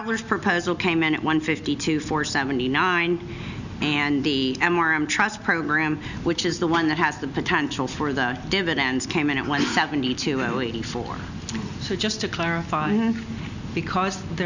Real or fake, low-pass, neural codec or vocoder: real; 7.2 kHz; none